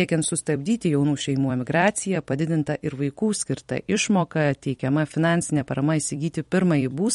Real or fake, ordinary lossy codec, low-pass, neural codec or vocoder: fake; MP3, 48 kbps; 19.8 kHz; vocoder, 44.1 kHz, 128 mel bands every 256 samples, BigVGAN v2